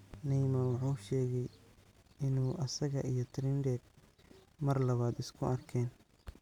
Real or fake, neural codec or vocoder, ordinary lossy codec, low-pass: real; none; none; 19.8 kHz